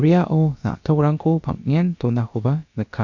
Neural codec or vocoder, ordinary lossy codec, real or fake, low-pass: codec, 16 kHz, about 1 kbps, DyCAST, with the encoder's durations; none; fake; 7.2 kHz